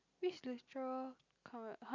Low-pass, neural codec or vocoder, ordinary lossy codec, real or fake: 7.2 kHz; none; none; real